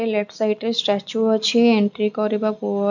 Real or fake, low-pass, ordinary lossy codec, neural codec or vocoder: real; 7.2 kHz; none; none